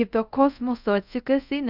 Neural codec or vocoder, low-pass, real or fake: codec, 24 kHz, 0.5 kbps, DualCodec; 5.4 kHz; fake